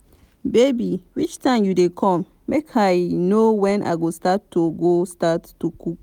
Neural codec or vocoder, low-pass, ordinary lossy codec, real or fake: none; 19.8 kHz; none; real